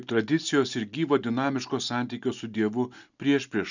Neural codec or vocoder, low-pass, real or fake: none; 7.2 kHz; real